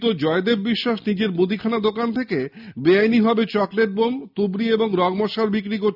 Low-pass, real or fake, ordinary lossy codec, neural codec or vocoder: 5.4 kHz; real; none; none